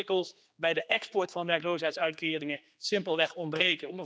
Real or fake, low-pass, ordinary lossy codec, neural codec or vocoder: fake; none; none; codec, 16 kHz, 2 kbps, X-Codec, HuBERT features, trained on general audio